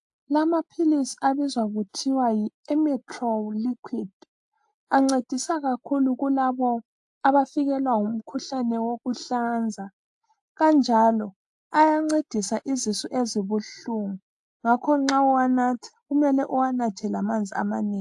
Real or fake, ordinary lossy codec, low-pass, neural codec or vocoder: real; AAC, 64 kbps; 10.8 kHz; none